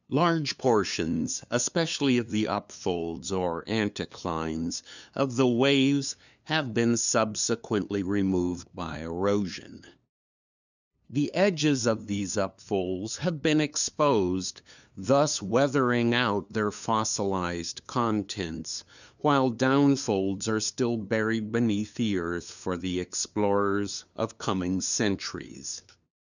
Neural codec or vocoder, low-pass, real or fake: codec, 16 kHz, 2 kbps, FunCodec, trained on LibriTTS, 25 frames a second; 7.2 kHz; fake